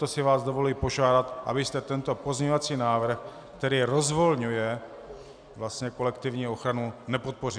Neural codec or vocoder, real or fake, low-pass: none; real; 9.9 kHz